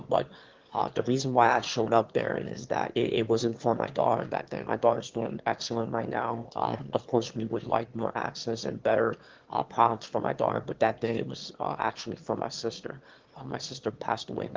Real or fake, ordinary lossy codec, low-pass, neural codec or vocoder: fake; Opus, 16 kbps; 7.2 kHz; autoencoder, 22.05 kHz, a latent of 192 numbers a frame, VITS, trained on one speaker